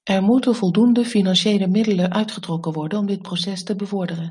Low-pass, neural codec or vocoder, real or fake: 10.8 kHz; none; real